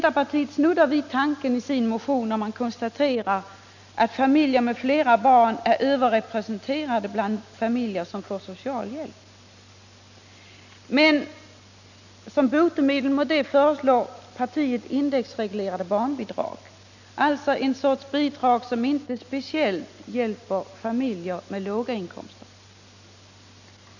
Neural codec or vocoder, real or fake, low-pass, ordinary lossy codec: none; real; 7.2 kHz; none